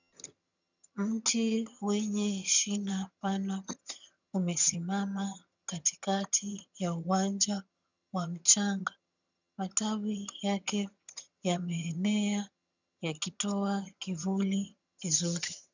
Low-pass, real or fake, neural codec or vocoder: 7.2 kHz; fake; vocoder, 22.05 kHz, 80 mel bands, HiFi-GAN